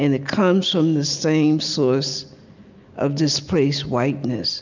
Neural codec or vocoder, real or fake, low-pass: none; real; 7.2 kHz